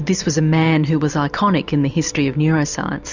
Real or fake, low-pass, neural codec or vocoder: real; 7.2 kHz; none